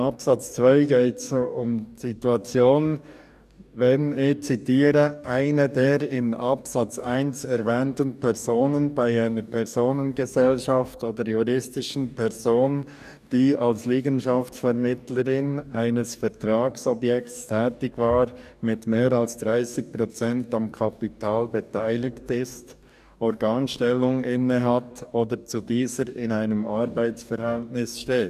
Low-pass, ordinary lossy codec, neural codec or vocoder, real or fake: 14.4 kHz; none; codec, 44.1 kHz, 2.6 kbps, DAC; fake